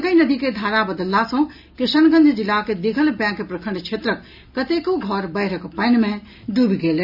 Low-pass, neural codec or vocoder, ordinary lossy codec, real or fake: 5.4 kHz; none; none; real